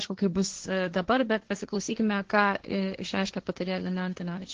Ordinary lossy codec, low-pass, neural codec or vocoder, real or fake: Opus, 16 kbps; 7.2 kHz; codec, 16 kHz, 1.1 kbps, Voila-Tokenizer; fake